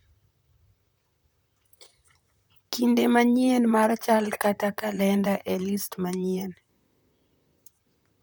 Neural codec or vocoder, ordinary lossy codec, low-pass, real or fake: vocoder, 44.1 kHz, 128 mel bands, Pupu-Vocoder; none; none; fake